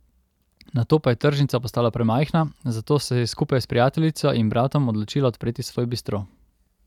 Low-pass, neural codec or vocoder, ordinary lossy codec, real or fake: 19.8 kHz; none; none; real